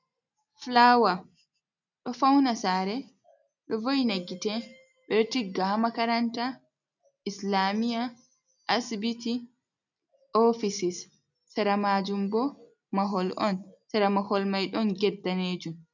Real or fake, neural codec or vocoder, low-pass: real; none; 7.2 kHz